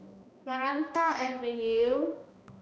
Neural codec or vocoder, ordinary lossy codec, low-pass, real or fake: codec, 16 kHz, 1 kbps, X-Codec, HuBERT features, trained on balanced general audio; none; none; fake